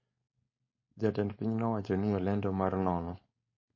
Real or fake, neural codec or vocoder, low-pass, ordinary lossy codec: fake; codec, 16 kHz, 4.8 kbps, FACodec; 7.2 kHz; MP3, 32 kbps